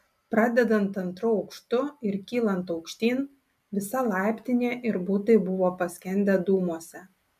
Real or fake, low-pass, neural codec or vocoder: real; 14.4 kHz; none